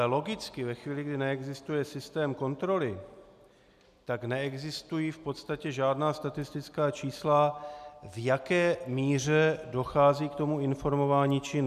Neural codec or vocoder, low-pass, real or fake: none; 14.4 kHz; real